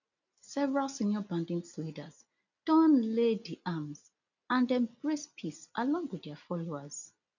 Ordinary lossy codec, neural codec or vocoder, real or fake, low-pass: none; none; real; 7.2 kHz